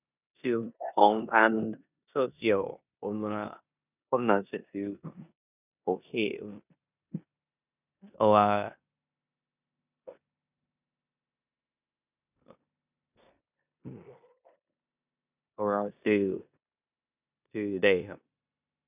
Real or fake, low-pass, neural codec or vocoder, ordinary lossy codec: fake; 3.6 kHz; codec, 16 kHz in and 24 kHz out, 0.9 kbps, LongCat-Audio-Codec, four codebook decoder; none